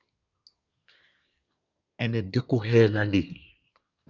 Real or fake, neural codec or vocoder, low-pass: fake; codec, 24 kHz, 1 kbps, SNAC; 7.2 kHz